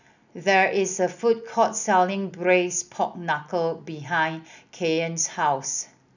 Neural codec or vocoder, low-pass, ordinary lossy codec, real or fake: none; 7.2 kHz; none; real